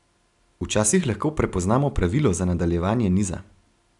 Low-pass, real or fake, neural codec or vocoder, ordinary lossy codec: 10.8 kHz; real; none; none